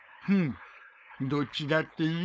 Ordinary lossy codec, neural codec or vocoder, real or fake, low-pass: none; codec, 16 kHz, 4.8 kbps, FACodec; fake; none